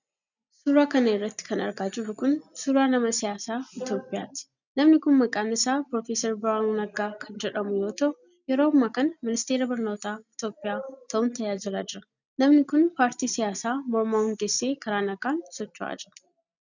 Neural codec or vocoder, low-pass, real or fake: none; 7.2 kHz; real